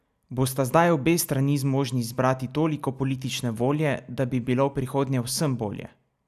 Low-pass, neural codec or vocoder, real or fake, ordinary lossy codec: 14.4 kHz; none; real; none